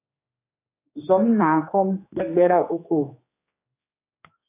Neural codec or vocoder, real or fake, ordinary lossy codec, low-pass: codec, 16 kHz, 2 kbps, X-Codec, HuBERT features, trained on general audio; fake; AAC, 24 kbps; 3.6 kHz